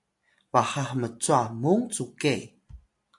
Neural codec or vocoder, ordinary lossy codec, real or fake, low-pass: none; AAC, 64 kbps; real; 10.8 kHz